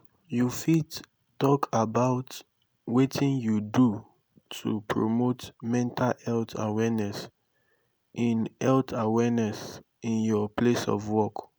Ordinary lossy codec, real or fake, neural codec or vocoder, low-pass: none; real; none; none